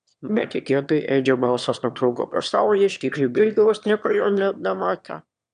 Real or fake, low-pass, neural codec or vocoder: fake; 9.9 kHz; autoencoder, 22.05 kHz, a latent of 192 numbers a frame, VITS, trained on one speaker